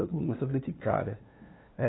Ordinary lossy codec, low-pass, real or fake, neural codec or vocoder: AAC, 16 kbps; 7.2 kHz; fake; codec, 16 kHz, 2 kbps, FunCodec, trained on LibriTTS, 25 frames a second